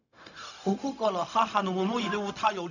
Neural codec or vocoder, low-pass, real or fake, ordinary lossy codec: codec, 16 kHz, 0.4 kbps, LongCat-Audio-Codec; 7.2 kHz; fake; none